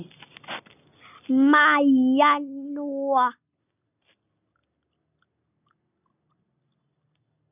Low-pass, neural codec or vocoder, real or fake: 3.6 kHz; none; real